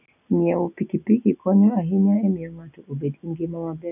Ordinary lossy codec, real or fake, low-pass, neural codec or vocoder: none; real; 3.6 kHz; none